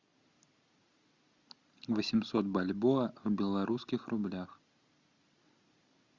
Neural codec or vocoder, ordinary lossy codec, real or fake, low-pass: none; Opus, 64 kbps; real; 7.2 kHz